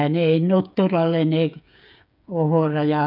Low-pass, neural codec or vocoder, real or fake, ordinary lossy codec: 5.4 kHz; codec, 16 kHz, 8 kbps, FreqCodec, smaller model; fake; none